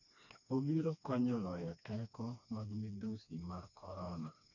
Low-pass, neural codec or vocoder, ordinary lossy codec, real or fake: 7.2 kHz; codec, 16 kHz, 2 kbps, FreqCodec, smaller model; none; fake